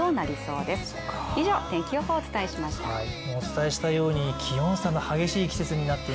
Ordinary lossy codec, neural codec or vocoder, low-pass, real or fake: none; none; none; real